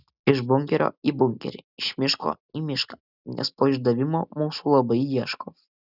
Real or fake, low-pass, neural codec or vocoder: real; 5.4 kHz; none